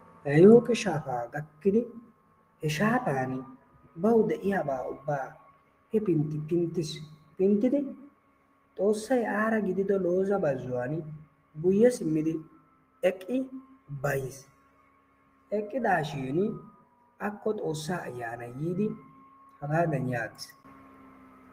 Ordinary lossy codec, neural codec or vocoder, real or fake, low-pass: Opus, 24 kbps; none; real; 14.4 kHz